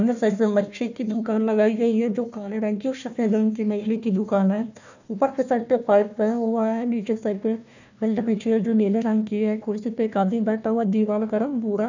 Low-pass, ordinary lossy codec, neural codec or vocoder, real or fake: 7.2 kHz; none; codec, 16 kHz, 1 kbps, FunCodec, trained on Chinese and English, 50 frames a second; fake